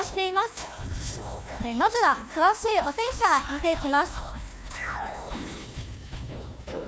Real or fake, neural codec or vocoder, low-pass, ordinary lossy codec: fake; codec, 16 kHz, 1 kbps, FunCodec, trained on Chinese and English, 50 frames a second; none; none